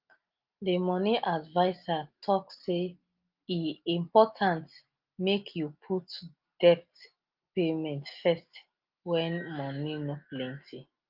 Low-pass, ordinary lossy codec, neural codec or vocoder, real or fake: 5.4 kHz; Opus, 24 kbps; none; real